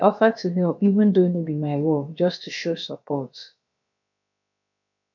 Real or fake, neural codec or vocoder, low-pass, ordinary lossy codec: fake; codec, 16 kHz, about 1 kbps, DyCAST, with the encoder's durations; 7.2 kHz; none